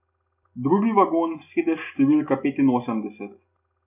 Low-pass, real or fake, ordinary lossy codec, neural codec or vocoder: 3.6 kHz; real; none; none